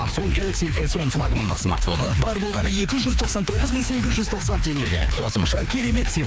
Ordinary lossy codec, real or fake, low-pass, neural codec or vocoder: none; fake; none; codec, 16 kHz, 2 kbps, FreqCodec, larger model